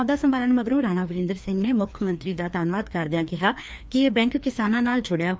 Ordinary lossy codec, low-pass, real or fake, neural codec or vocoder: none; none; fake; codec, 16 kHz, 2 kbps, FreqCodec, larger model